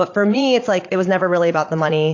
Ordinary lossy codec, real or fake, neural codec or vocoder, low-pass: AAC, 48 kbps; fake; vocoder, 22.05 kHz, 80 mel bands, Vocos; 7.2 kHz